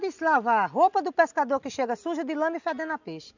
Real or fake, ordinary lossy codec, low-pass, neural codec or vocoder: real; none; 7.2 kHz; none